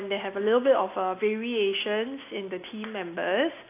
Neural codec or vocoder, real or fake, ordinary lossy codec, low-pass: none; real; AAC, 24 kbps; 3.6 kHz